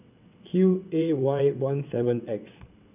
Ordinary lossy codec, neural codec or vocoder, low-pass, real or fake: none; vocoder, 22.05 kHz, 80 mel bands, WaveNeXt; 3.6 kHz; fake